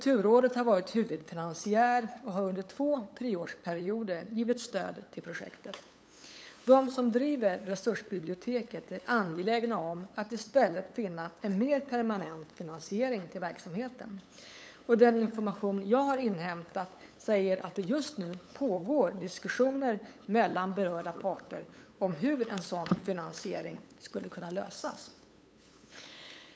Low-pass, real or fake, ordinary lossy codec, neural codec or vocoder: none; fake; none; codec, 16 kHz, 8 kbps, FunCodec, trained on LibriTTS, 25 frames a second